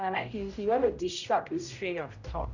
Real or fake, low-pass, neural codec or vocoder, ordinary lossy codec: fake; 7.2 kHz; codec, 16 kHz, 0.5 kbps, X-Codec, HuBERT features, trained on general audio; none